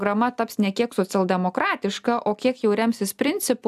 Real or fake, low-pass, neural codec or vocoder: real; 14.4 kHz; none